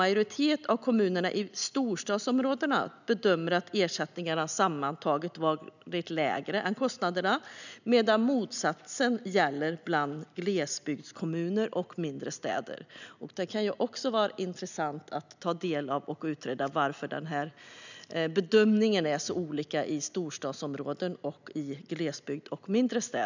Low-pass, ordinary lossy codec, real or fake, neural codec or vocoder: 7.2 kHz; none; real; none